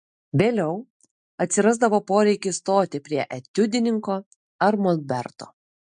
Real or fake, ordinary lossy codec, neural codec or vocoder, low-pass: real; MP3, 64 kbps; none; 9.9 kHz